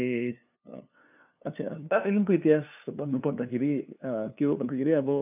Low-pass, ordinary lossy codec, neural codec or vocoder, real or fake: 3.6 kHz; none; codec, 16 kHz, 2 kbps, FunCodec, trained on LibriTTS, 25 frames a second; fake